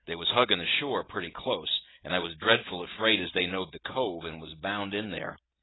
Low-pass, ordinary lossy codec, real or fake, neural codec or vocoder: 7.2 kHz; AAC, 16 kbps; real; none